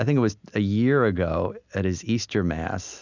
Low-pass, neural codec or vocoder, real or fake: 7.2 kHz; none; real